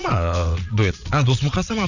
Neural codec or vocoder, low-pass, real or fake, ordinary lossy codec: vocoder, 22.05 kHz, 80 mel bands, Vocos; 7.2 kHz; fake; none